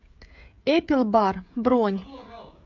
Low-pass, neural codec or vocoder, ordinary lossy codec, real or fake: 7.2 kHz; codec, 16 kHz, 16 kbps, FreqCodec, smaller model; MP3, 64 kbps; fake